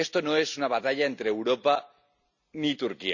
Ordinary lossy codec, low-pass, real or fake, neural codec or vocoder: none; 7.2 kHz; real; none